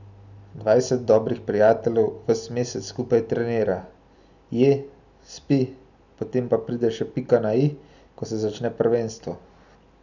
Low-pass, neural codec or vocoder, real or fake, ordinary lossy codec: 7.2 kHz; none; real; none